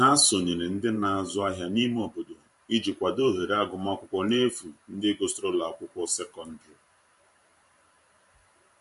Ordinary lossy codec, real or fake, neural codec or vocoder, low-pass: MP3, 48 kbps; fake; vocoder, 44.1 kHz, 128 mel bands every 512 samples, BigVGAN v2; 14.4 kHz